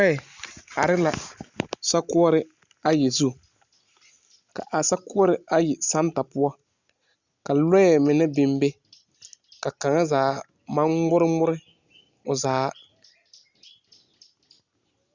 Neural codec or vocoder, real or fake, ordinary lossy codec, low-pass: none; real; Opus, 64 kbps; 7.2 kHz